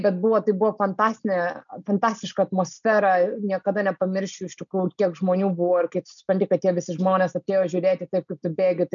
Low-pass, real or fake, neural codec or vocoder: 7.2 kHz; real; none